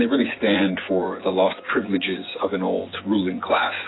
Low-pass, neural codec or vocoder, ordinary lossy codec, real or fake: 7.2 kHz; vocoder, 24 kHz, 100 mel bands, Vocos; AAC, 16 kbps; fake